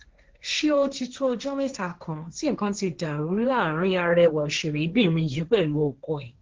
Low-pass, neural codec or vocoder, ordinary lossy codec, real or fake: 7.2 kHz; codec, 16 kHz, 1.1 kbps, Voila-Tokenizer; Opus, 16 kbps; fake